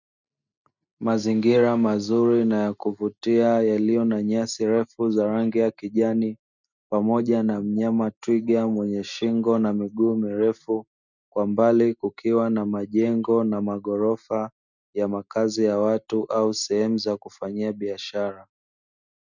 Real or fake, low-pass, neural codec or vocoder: real; 7.2 kHz; none